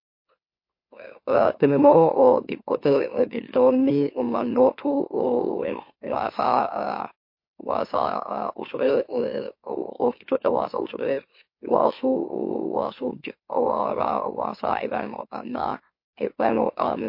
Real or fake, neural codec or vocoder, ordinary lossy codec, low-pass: fake; autoencoder, 44.1 kHz, a latent of 192 numbers a frame, MeloTTS; MP3, 32 kbps; 5.4 kHz